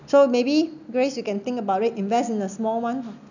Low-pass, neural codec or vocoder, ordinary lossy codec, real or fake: 7.2 kHz; none; none; real